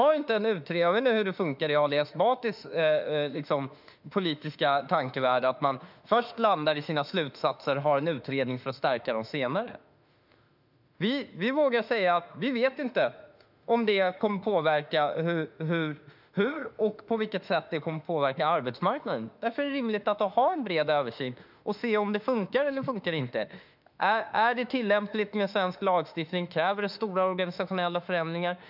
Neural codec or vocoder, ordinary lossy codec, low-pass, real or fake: autoencoder, 48 kHz, 32 numbers a frame, DAC-VAE, trained on Japanese speech; none; 5.4 kHz; fake